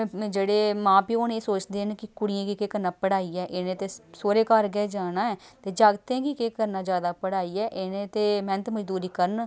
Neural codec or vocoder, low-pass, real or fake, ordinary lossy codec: none; none; real; none